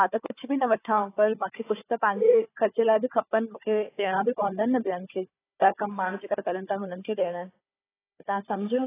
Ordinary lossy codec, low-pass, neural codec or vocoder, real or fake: AAC, 16 kbps; 3.6 kHz; codec, 16 kHz, 4 kbps, FunCodec, trained on Chinese and English, 50 frames a second; fake